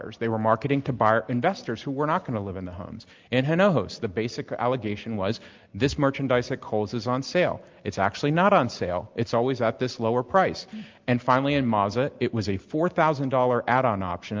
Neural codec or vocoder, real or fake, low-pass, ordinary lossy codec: none; real; 7.2 kHz; Opus, 24 kbps